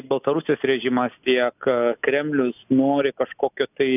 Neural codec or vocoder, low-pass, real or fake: none; 3.6 kHz; real